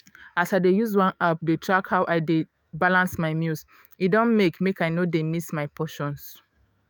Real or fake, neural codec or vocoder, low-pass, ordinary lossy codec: fake; autoencoder, 48 kHz, 128 numbers a frame, DAC-VAE, trained on Japanese speech; none; none